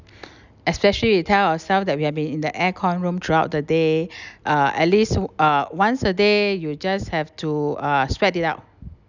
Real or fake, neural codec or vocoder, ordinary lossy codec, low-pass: real; none; none; 7.2 kHz